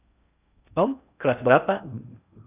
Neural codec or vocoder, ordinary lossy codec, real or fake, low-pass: codec, 16 kHz in and 24 kHz out, 0.6 kbps, FocalCodec, streaming, 4096 codes; AAC, 24 kbps; fake; 3.6 kHz